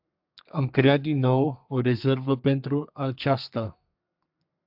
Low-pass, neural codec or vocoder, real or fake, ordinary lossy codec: 5.4 kHz; codec, 32 kHz, 1.9 kbps, SNAC; fake; AAC, 48 kbps